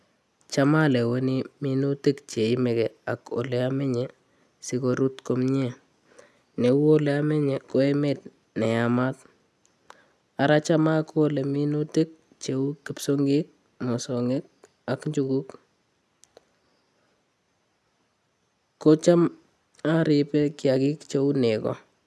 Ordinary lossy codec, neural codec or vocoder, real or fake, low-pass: none; none; real; none